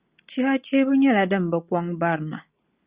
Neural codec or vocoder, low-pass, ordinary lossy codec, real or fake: none; 3.6 kHz; Opus, 64 kbps; real